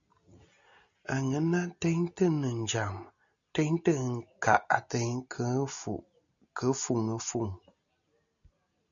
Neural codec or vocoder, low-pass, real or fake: none; 7.2 kHz; real